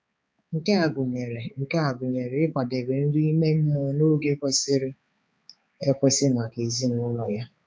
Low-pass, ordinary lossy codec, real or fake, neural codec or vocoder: none; none; fake; codec, 16 kHz, 4 kbps, X-Codec, HuBERT features, trained on balanced general audio